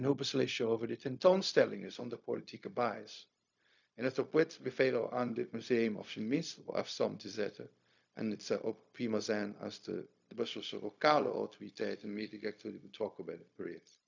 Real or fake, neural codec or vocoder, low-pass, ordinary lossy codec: fake; codec, 16 kHz, 0.4 kbps, LongCat-Audio-Codec; 7.2 kHz; none